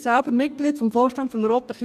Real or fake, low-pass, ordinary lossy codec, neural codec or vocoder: fake; 14.4 kHz; none; codec, 44.1 kHz, 2.6 kbps, DAC